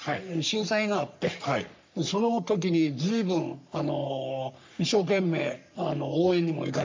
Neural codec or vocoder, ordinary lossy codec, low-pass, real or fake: codec, 44.1 kHz, 3.4 kbps, Pupu-Codec; MP3, 64 kbps; 7.2 kHz; fake